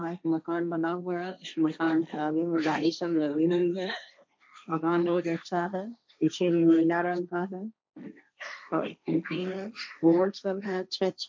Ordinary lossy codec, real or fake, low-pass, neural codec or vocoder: none; fake; none; codec, 16 kHz, 1.1 kbps, Voila-Tokenizer